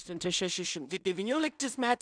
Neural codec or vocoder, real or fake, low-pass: codec, 16 kHz in and 24 kHz out, 0.4 kbps, LongCat-Audio-Codec, two codebook decoder; fake; 9.9 kHz